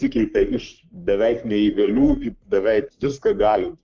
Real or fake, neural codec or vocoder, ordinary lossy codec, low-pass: fake; codec, 44.1 kHz, 3.4 kbps, Pupu-Codec; Opus, 32 kbps; 7.2 kHz